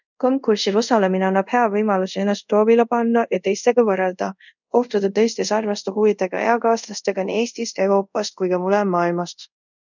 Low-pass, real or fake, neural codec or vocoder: 7.2 kHz; fake; codec, 24 kHz, 0.5 kbps, DualCodec